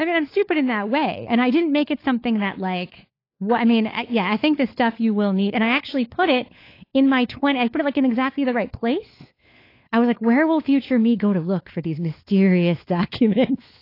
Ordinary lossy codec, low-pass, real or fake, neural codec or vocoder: AAC, 32 kbps; 5.4 kHz; fake; codec, 16 kHz, 4 kbps, FunCodec, trained on LibriTTS, 50 frames a second